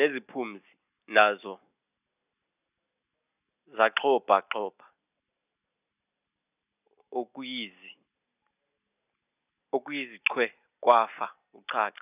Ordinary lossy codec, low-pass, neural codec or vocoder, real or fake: none; 3.6 kHz; none; real